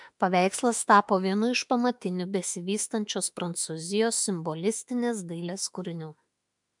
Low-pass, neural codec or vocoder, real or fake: 10.8 kHz; autoencoder, 48 kHz, 32 numbers a frame, DAC-VAE, trained on Japanese speech; fake